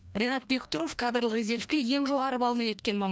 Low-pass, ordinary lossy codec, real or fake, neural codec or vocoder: none; none; fake; codec, 16 kHz, 1 kbps, FreqCodec, larger model